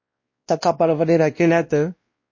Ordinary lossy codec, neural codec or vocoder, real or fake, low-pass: MP3, 32 kbps; codec, 16 kHz, 1 kbps, X-Codec, WavLM features, trained on Multilingual LibriSpeech; fake; 7.2 kHz